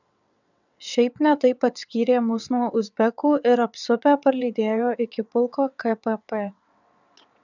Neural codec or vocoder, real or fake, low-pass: vocoder, 22.05 kHz, 80 mel bands, WaveNeXt; fake; 7.2 kHz